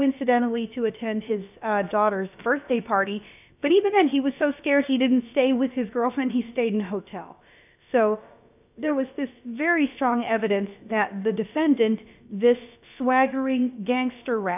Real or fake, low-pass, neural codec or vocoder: fake; 3.6 kHz; codec, 16 kHz, about 1 kbps, DyCAST, with the encoder's durations